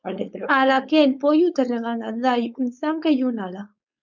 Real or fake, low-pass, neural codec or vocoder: fake; 7.2 kHz; codec, 16 kHz, 4.8 kbps, FACodec